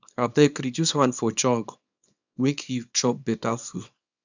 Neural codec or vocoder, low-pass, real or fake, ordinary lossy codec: codec, 24 kHz, 0.9 kbps, WavTokenizer, small release; 7.2 kHz; fake; none